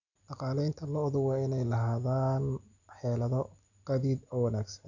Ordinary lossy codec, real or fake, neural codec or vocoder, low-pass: none; real; none; 7.2 kHz